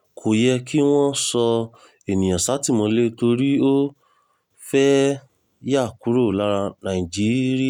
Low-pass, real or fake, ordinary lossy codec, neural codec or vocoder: 19.8 kHz; real; none; none